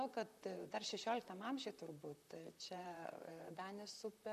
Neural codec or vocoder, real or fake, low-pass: vocoder, 44.1 kHz, 128 mel bands, Pupu-Vocoder; fake; 14.4 kHz